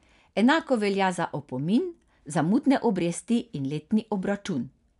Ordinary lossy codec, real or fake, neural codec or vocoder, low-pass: none; real; none; 10.8 kHz